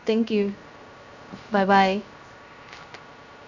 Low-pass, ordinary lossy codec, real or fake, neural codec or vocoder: 7.2 kHz; none; fake; codec, 16 kHz, 0.3 kbps, FocalCodec